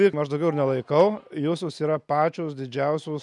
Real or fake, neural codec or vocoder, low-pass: real; none; 10.8 kHz